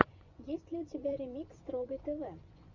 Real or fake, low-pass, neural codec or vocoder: fake; 7.2 kHz; vocoder, 24 kHz, 100 mel bands, Vocos